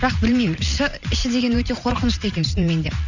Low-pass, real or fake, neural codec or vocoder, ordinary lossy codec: 7.2 kHz; fake; vocoder, 44.1 kHz, 80 mel bands, Vocos; none